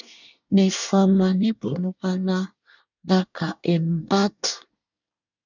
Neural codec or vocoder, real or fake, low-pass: codec, 24 kHz, 1 kbps, SNAC; fake; 7.2 kHz